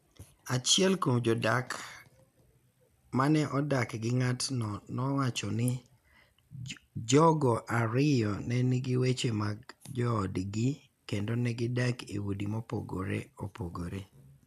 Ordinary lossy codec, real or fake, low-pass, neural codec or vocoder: none; real; 14.4 kHz; none